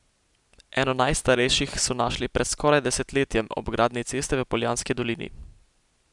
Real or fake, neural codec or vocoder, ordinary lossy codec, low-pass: real; none; none; 10.8 kHz